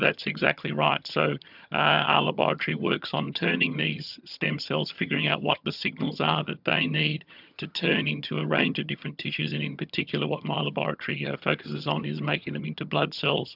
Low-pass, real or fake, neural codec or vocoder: 5.4 kHz; fake; vocoder, 22.05 kHz, 80 mel bands, HiFi-GAN